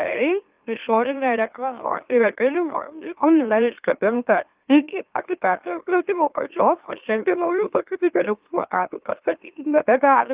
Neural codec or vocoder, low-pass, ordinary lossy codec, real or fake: autoencoder, 44.1 kHz, a latent of 192 numbers a frame, MeloTTS; 3.6 kHz; Opus, 24 kbps; fake